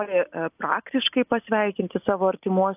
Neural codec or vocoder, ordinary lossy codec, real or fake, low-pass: none; AAC, 32 kbps; real; 3.6 kHz